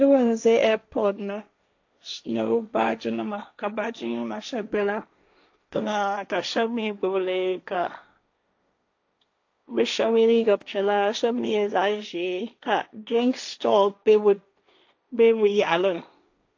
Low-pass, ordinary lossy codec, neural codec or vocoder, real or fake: 7.2 kHz; AAC, 48 kbps; codec, 16 kHz, 1.1 kbps, Voila-Tokenizer; fake